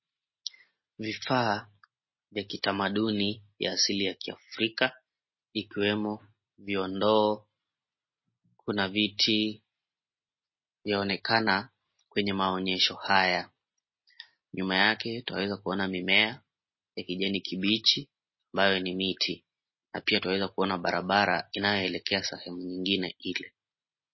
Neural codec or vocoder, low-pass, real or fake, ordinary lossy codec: none; 7.2 kHz; real; MP3, 24 kbps